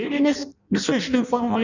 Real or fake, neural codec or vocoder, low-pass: fake; codec, 16 kHz in and 24 kHz out, 0.6 kbps, FireRedTTS-2 codec; 7.2 kHz